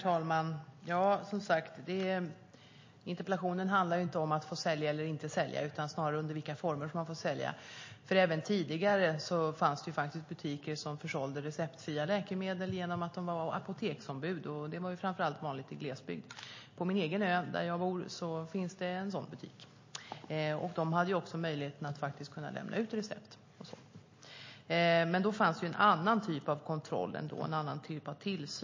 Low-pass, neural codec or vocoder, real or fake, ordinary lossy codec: 7.2 kHz; none; real; MP3, 32 kbps